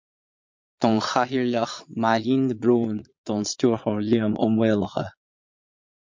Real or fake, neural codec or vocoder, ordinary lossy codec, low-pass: fake; vocoder, 22.05 kHz, 80 mel bands, Vocos; MP3, 48 kbps; 7.2 kHz